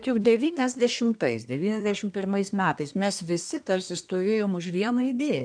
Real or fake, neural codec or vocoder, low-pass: fake; codec, 24 kHz, 1 kbps, SNAC; 9.9 kHz